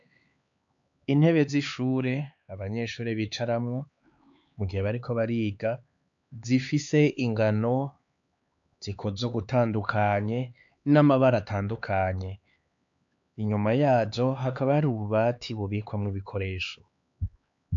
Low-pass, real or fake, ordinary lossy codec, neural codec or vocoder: 7.2 kHz; fake; AAC, 64 kbps; codec, 16 kHz, 4 kbps, X-Codec, HuBERT features, trained on LibriSpeech